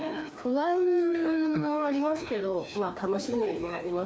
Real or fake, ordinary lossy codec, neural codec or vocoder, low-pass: fake; none; codec, 16 kHz, 2 kbps, FreqCodec, larger model; none